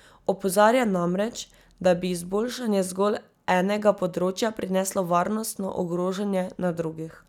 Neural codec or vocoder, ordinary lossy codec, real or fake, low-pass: none; none; real; 19.8 kHz